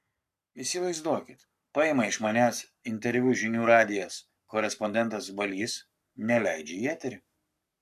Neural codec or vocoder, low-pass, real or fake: codec, 44.1 kHz, 7.8 kbps, Pupu-Codec; 14.4 kHz; fake